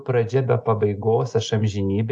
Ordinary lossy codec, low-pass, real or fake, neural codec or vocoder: AAC, 64 kbps; 10.8 kHz; real; none